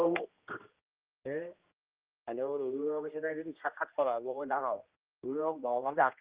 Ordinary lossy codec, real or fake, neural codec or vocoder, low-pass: Opus, 32 kbps; fake; codec, 16 kHz, 1 kbps, X-Codec, HuBERT features, trained on general audio; 3.6 kHz